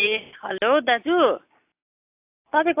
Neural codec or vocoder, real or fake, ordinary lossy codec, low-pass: none; real; AAC, 32 kbps; 3.6 kHz